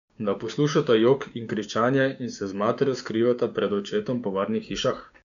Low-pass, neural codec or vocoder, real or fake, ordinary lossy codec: 7.2 kHz; codec, 16 kHz, 6 kbps, DAC; fake; MP3, 96 kbps